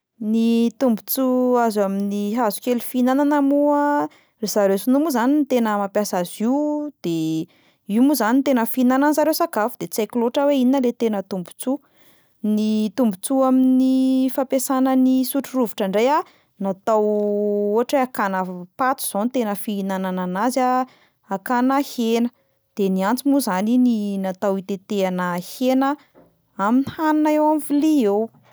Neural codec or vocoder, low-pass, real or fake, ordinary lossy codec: none; none; real; none